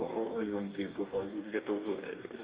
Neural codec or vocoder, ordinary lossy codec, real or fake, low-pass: codec, 44.1 kHz, 2.6 kbps, DAC; Opus, 32 kbps; fake; 3.6 kHz